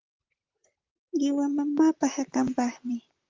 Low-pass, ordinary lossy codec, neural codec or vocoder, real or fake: 7.2 kHz; Opus, 24 kbps; vocoder, 44.1 kHz, 128 mel bands, Pupu-Vocoder; fake